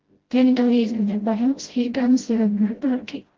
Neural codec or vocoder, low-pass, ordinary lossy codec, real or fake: codec, 16 kHz, 0.5 kbps, FreqCodec, smaller model; 7.2 kHz; Opus, 16 kbps; fake